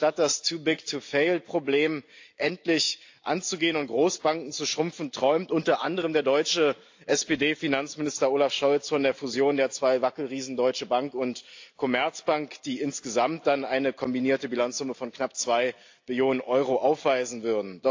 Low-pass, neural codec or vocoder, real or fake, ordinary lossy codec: 7.2 kHz; none; real; AAC, 48 kbps